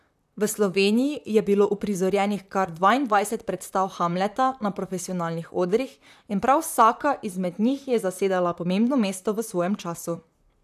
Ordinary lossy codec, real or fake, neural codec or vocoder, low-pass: none; fake; vocoder, 44.1 kHz, 128 mel bands, Pupu-Vocoder; 14.4 kHz